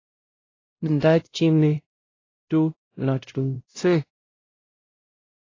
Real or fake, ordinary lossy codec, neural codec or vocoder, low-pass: fake; AAC, 32 kbps; codec, 16 kHz, 0.5 kbps, X-Codec, WavLM features, trained on Multilingual LibriSpeech; 7.2 kHz